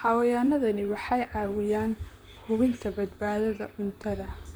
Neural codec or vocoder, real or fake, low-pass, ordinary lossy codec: vocoder, 44.1 kHz, 128 mel bands, Pupu-Vocoder; fake; none; none